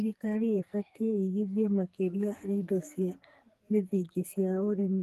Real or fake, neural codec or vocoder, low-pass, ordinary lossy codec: fake; codec, 44.1 kHz, 2.6 kbps, SNAC; 14.4 kHz; Opus, 24 kbps